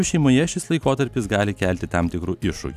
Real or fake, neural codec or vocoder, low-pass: real; none; 14.4 kHz